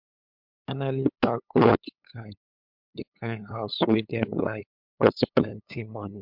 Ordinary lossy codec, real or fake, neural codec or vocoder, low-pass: MP3, 48 kbps; fake; codec, 16 kHz, 8 kbps, FunCodec, trained on LibriTTS, 25 frames a second; 5.4 kHz